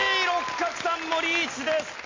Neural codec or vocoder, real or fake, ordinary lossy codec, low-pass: none; real; AAC, 32 kbps; 7.2 kHz